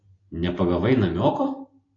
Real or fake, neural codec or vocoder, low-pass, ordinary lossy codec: real; none; 7.2 kHz; AAC, 32 kbps